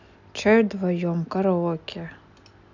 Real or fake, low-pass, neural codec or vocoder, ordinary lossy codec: real; 7.2 kHz; none; none